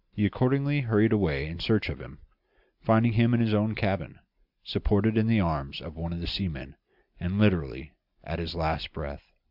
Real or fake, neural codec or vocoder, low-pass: real; none; 5.4 kHz